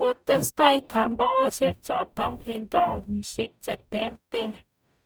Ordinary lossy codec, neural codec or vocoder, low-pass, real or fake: none; codec, 44.1 kHz, 0.9 kbps, DAC; none; fake